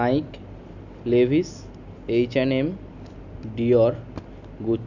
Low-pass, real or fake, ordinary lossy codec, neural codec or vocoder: 7.2 kHz; real; none; none